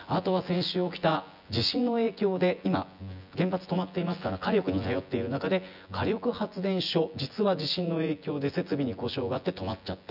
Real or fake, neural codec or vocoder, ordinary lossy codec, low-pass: fake; vocoder, 24 kHz, 100 mel bands, Vocos; none; 5.4 kHz